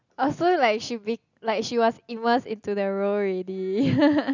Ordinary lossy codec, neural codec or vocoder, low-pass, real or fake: none; none; 7.2 kHz; real